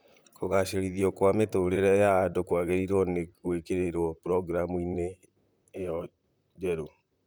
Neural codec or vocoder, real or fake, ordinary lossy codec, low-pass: vocoder, 44.1 kHz, 128 mel bands, Pupu-Vocoder; fake; none; none